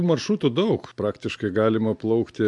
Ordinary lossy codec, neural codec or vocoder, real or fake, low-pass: MP3, 64 kbps; none; real; 10.8 kHz